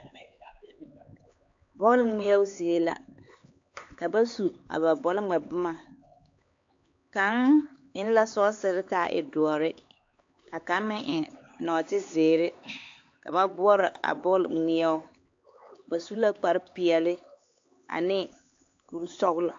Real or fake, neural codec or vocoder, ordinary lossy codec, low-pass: fake; codec, 16 kHz, 4 kbps, X-Codec, HuBERT features, trained on LibriSpeech; AAC, 48 kbps; 7.2 kHz